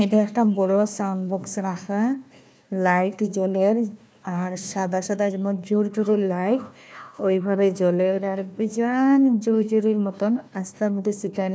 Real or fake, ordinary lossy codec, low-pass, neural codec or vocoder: fake; none; none; codec, 16 kHz, 1 kbps, FunCodec, trained on Chinese and English, 50 frames a second